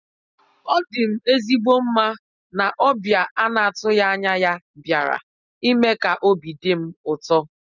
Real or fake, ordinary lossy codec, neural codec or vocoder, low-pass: real; none; none; 7.2 kHz